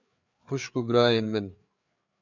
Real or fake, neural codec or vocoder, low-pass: fake; codec, 16 kHz, 4 kbps, FreqCodec, larger model; 7.2 kHz